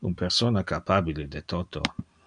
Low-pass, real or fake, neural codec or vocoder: 9.9 kHz; real; none